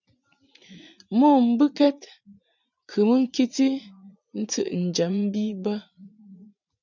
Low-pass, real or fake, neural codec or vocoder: 7.2 kHz; fake; vocoder, 44.1 kHz, 80 mel bands, Vocos